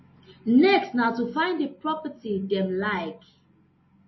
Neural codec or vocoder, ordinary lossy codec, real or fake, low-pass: none; MP3, 24 kbps; real; 7.2 kHz